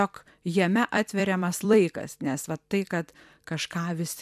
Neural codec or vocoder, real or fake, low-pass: vocoder, 44.1 kHz, 128 mel bands every 256 samples, BigVGAN v2; fake; 14.4 kHz